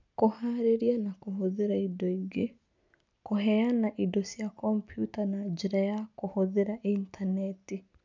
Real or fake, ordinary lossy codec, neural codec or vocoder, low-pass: real; MP3, 64 kbps; none; 7.2 kHz